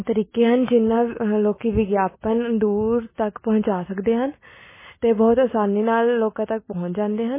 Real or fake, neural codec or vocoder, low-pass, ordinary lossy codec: real; none; 3.6 kHz; MP3, 16 kbps